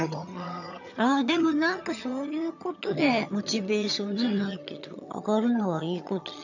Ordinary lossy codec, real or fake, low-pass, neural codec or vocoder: none; fake; 7.2 kHz; vocoder, 22.05 kHz, 80 mel bands, HiFi-GAN